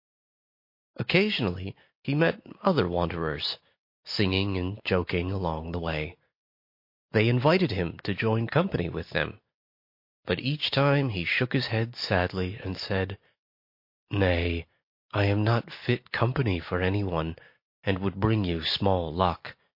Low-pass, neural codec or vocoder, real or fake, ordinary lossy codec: 5.4 kHz; none; real; MP3, 32 kbps